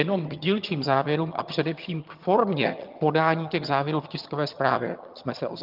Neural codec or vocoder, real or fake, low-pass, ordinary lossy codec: vocoder, 22.05 kHz, 80 mel bands, HiFi-GAN; fake; 5.4 kHz; Opus, 24 kbps